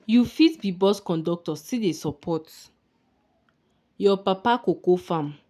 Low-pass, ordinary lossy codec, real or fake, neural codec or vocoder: 14.4 kHz; none; real; none